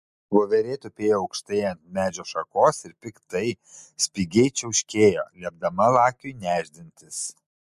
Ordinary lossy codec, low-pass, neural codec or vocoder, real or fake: MP3, 64 kbps; 14.4 kHz; none; real